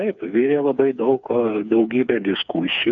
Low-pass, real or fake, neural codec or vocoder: 7.2 kHz; fake; codec, 16 kHz, 4 kbps, FreqCodec, smaller model